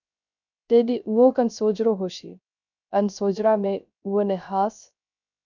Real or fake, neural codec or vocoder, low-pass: fake; codec, 16 kHz, 0.3 kbps, FocalCodec; 7.2 kHz